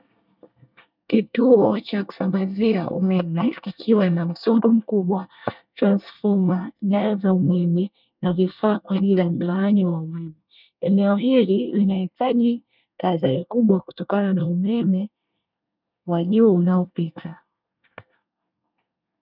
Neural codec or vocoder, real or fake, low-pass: codec, 24 kHz, 1 kbps, SNAC; fake; 5.4 kHz